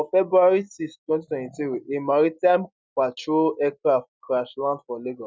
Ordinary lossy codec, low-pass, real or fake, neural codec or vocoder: none; none; real; none